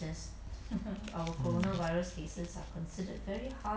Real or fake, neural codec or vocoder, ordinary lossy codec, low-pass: real; none; none; none